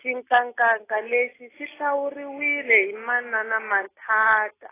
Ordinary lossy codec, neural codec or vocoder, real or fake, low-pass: AAC, 16 kbps; none; real; 3.6 kHz